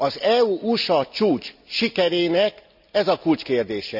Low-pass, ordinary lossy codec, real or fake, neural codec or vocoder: 5.4 kHz; none; real; none